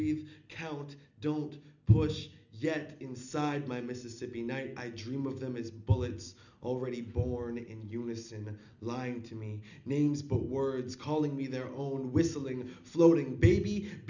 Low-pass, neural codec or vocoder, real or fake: 7.2 kHz; none; real